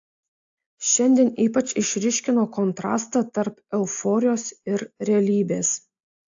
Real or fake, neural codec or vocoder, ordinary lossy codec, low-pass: real; none; AAC, 64 kbps; 7.2 kHz